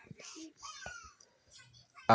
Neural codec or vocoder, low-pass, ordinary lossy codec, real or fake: none; none; none; real